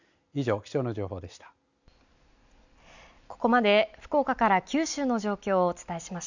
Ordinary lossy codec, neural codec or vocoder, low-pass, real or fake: none; none; 7.2 kHz; real